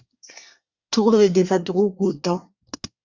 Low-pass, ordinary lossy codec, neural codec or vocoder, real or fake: 7.2 kHz; Opus, 64 kbps; codec, 24 kHz, 1 kbps, SNAC; fake